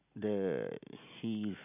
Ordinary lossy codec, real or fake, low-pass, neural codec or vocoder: none; real; 3.6 kHz; none